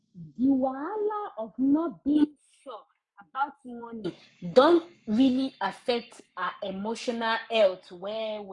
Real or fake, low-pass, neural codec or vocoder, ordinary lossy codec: fake; 10.8 kHz; codec, 44.1 kHz, 7.8 kbps, Pupu-Codec; none